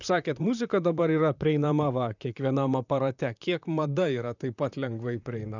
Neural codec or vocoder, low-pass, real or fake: vocoder, 44.1 kHz, 128 mel bands, Pupu-Vocoder; 7.2 kHz; fake